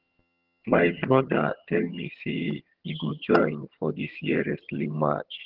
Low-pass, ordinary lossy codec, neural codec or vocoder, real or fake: 5.4 kHz; Opus, 16 kbps; vocoder, 22.05 kHz, 80 mel bands, HiFi-GAN; fake